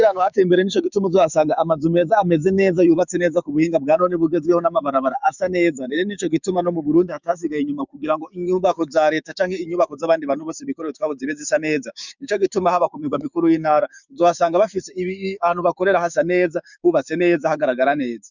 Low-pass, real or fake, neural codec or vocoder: 7.2 kHz; fake; codec, 16 kHz, 6 kbps, DAC